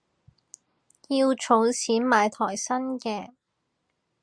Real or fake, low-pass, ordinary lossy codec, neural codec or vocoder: real; 9.9 kHz; Opus, 64 kbps; none